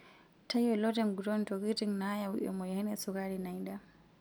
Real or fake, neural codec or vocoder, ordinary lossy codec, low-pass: real; none; none; none